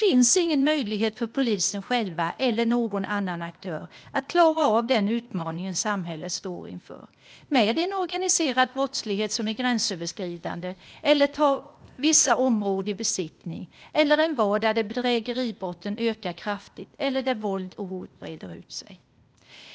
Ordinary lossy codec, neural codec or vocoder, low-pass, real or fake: none; codec, 16 kHz, 0.8 kbps, ZipCodec; none; fake